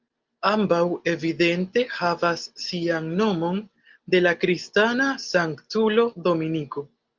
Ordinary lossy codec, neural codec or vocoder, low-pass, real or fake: Opus, 32 kbps; none; 7.2 kHz; real